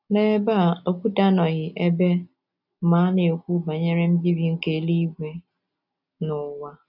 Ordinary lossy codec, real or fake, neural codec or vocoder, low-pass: none; real; none; 5.4 kHz